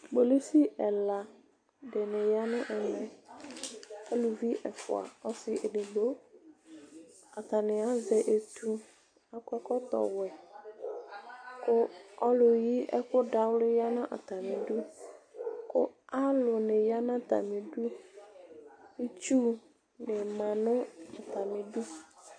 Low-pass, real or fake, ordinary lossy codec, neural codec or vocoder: 9.9 kHz; real; AAC, 48 kbps; none